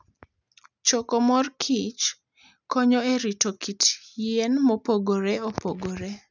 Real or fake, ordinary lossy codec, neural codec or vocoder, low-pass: real; none; none; 7.2 kHz